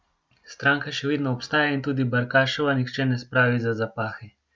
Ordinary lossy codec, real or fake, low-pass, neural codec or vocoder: none; real; none; none